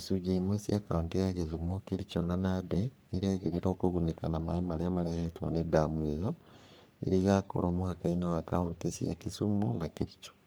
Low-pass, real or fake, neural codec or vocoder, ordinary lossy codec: none; fake; codec, 44.1 kHz, 3.4 kbps, Pupu-Codec; none